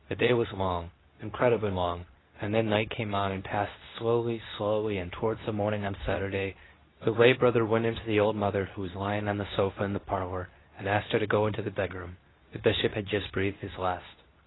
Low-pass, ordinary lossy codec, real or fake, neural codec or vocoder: 7.2 kHz; AAC, 16 kbps; fake; codec, 24 kHz, 0.9 kbps, WavTokenizer, medium speech release version 2